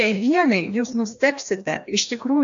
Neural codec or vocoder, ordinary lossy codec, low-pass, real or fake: codec, 16 kHz, 1 kbps, FreqCodec, larger model; AAC, 64 kbps; 7.2 kHz; fake